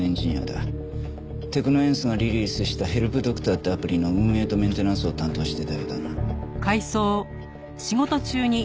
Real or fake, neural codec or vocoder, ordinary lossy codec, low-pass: real; none; none; none